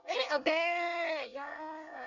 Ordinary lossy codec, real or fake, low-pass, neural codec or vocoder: none; fake; 7.2 kHz; codec, 24 kHz, 1 kbps, SNAC